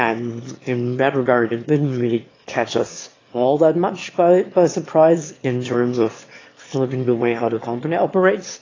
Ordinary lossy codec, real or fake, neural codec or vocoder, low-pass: AAC, 32 kbps; fake; autoencoder, 22.05 kHz, a latent of 192 numbers a frame, VITS, trained on one speaker; 7.2 kHz